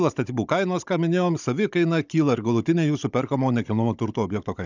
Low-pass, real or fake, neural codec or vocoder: 7.2 kHz; fake; codec, 16 kHz, 16 kbps, FunCodec, trained on Chinese and English, 50 frames a second